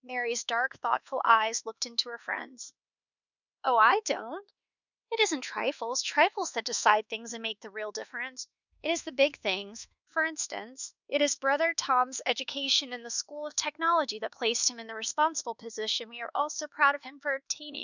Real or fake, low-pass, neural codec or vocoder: fake; 7.2 kHz; codec, 24 kHz, 1.2 kbps, DualCodec